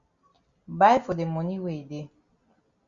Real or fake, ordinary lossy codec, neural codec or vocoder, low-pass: real; Opus, 64 kbps; none; 7.2 kHz